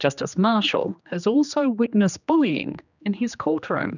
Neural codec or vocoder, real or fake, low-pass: codec, 16 kHz, 2 kbps, X-Codec, HuBERT features, trained on general audio; fake; 7.2 kHz